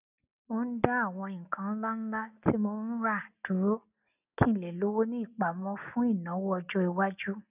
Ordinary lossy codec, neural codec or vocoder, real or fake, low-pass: none; none; real; 3.6 kHz